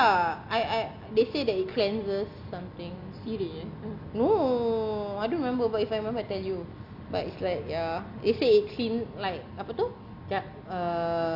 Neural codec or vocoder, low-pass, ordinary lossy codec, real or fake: none; 5.4 kHz; none; real